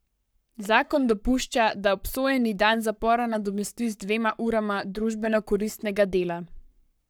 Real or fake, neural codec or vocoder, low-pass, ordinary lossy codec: fake; codec, 44.1 kHz, 7.8 kbps, Pupu-Codec; none; none